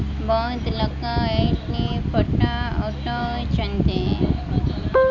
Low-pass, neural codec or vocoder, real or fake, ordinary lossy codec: 7.2 kHz; none; real; none